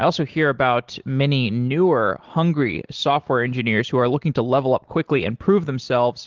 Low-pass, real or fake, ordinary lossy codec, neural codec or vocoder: 7.2 kHz; real; Opus, 16 kbps; none